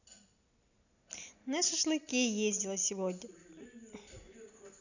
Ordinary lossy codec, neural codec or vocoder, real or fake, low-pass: none; none; real; 7.2 kHz